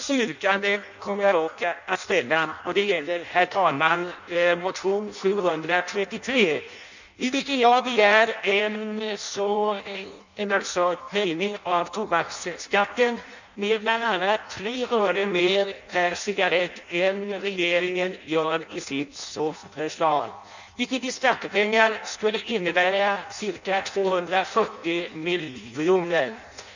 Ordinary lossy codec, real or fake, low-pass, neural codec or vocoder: none; fake; 7.2 kHz; codec, 16 kHz in and 24 kHz out, 0.6 kbps, FireRedTTS-2 codec